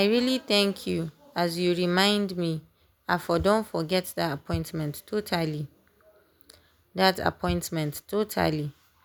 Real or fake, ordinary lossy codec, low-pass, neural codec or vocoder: real; none; none; none